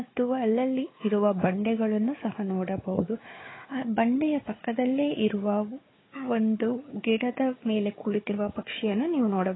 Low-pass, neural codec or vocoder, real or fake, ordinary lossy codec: 7.2 kHz; codec, 16 kHz, 4 kbps, FunCodec, trained on Chinese and English, 50 frames a second; fake; AAC, 16 kbps